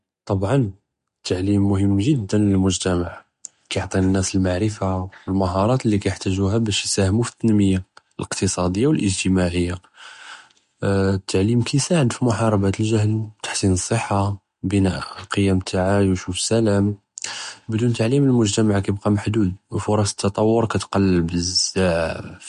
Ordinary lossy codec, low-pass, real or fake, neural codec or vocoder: MP3, 48 kbps; 14.4 kHz; real; none